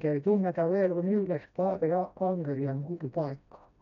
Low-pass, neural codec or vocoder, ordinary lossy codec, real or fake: 7.2 kHz; codec, 16 kHz, 1 kbps, FreqCodec, smaller model; none; fake